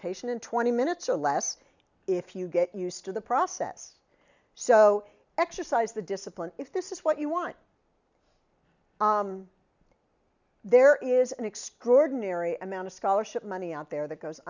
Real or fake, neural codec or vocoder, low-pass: real; none; 7.2 kHz